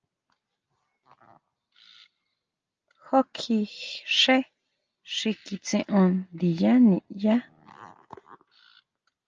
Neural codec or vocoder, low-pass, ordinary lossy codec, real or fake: none; 7.2 kHz; Opus, 24 kbps; real